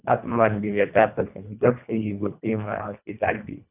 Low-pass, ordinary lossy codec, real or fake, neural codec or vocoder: 3.6 kHz; none; fake; codec, 24 kHz, 1.5 kbps, HILCodec